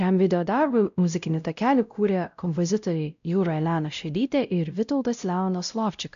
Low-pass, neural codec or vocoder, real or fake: 7.2 kHz; codec, 16 kHz, 0.5 kbps, X-Codec, WavLM features, trained on Multilingual LibriSpeech; fake